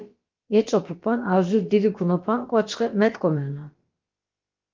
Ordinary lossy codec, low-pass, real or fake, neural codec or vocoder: Opus, 24 kbps; 7.2 kHz; fake; codec, 16 kHz, about 1 kbps, DyCAST, with the encoder's durations